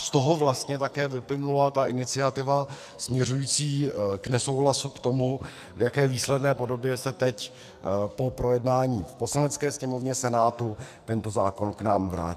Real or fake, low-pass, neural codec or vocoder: fake; 14.4 kHz; codec, 44.1 kHz, 2.6 kbps, SNAC